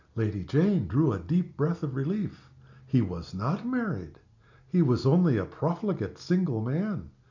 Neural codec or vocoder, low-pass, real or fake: none; 7.2 kHz; real